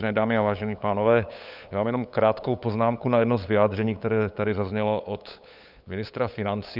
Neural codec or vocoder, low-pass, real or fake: codec, 16 kHz, 8 kbps, FunCodec, trained on LibriTTS, 25 frames a second; 5.4 kHz; fake